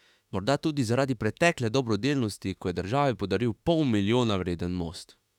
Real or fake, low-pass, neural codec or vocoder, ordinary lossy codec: fake; 19.8 kHz; autoencoder, 48 kHz, 32 numbers a frame, DAC-VAE, trained on Japanese speech; none